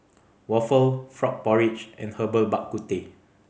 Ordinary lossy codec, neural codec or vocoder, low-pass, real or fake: none; none; none; real